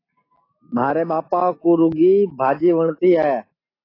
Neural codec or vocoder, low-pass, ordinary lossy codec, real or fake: none; 5.4 kHz; AAC, 24 kbps; real